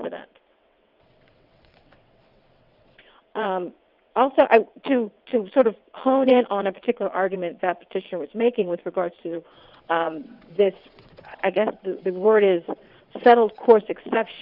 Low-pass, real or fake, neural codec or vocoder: 5.4 kHz; fake; vocoder, 22.05 kHz, 80 mel bands, WaveNeXt